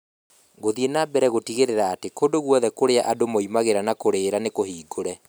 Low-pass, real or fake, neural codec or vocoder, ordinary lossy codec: none; real; none; none